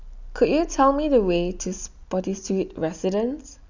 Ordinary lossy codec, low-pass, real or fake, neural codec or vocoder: none; 7.2 kHz; real; none